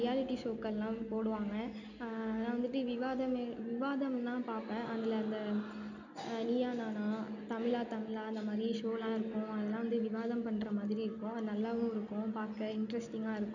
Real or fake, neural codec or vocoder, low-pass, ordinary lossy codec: real; none; 7.2 kHz; none